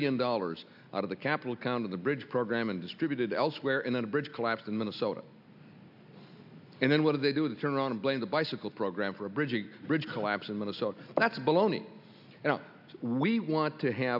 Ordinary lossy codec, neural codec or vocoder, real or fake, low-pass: AAC, 48 kbps; none; real; 5.4 kHz